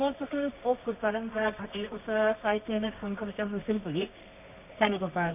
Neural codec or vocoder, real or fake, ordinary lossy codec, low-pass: codec, 24 kHz, 0.9 kbps, WavTokenizer, medium music audio release; fake; none; 3.6 kHz